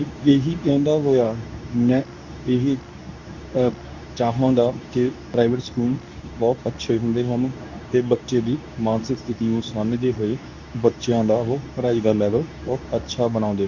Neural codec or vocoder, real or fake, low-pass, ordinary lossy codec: codec, 24 kHz, 0.9 kbps, WavTokenizer, medium speech release version 2; fake; 7.2 kHz; none